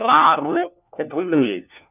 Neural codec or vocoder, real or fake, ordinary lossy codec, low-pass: codec, 16 kHz, 1 kbps, FunCodec, trained on LibriTTS, 50 frames a second; fake; none; 3.6 kHz